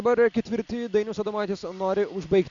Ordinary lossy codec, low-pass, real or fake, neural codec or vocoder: AAC, 64 kbps; 7.2 kHz; real; none